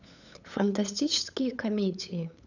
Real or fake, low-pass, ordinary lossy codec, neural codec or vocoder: fake; 7.2 kHz; none; codec, 16 kHz, 8 kbps, FunCodec, trained on LibriTTS, 25 frames a second